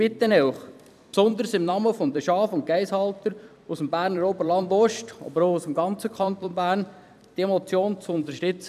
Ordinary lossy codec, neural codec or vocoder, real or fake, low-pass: none; vocoder, 44.1 kHz, 128 mel bands every 256 samples, BigVGAN v2; fake; 14.4 kHz